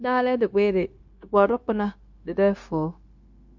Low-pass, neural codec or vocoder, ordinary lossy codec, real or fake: 7.2 kHz; codec, 16 kHz, 0.9 kbps, LongCat-Audio-Codec; MP3, 48 kbps; fake